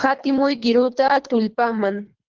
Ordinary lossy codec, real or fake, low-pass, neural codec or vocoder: Opus, 16 kbps; fake; 7.2 kHz; codec, 24 kHz, 3 kbps, HILCodec